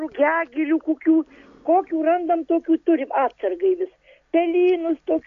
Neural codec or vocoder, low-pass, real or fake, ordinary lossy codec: none; 7.2 kHz; real; AAC, 48 kbps